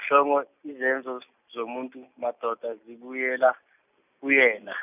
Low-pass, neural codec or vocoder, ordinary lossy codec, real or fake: 3.6 kHz; none; none; real